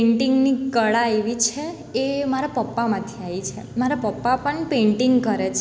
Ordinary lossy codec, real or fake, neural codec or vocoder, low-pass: none; real; none; none